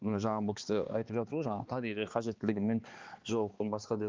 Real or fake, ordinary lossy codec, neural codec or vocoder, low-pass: fake; Opus, 32 kbps; codec, 16 kHz, 2 kbps, X-Codec, HuBERT features, trained on balanced general audio; 7.2 kHz